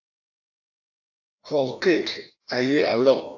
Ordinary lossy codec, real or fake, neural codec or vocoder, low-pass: AAC, 48 kbps; fake; codec, 16 kHz, 1 kbps, FreqCodec, larger model; 7.2 kHz